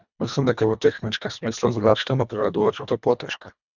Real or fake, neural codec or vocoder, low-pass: fake; codec, 24 kHz, 1.5 kbps, HILCodec; 7.2 kHz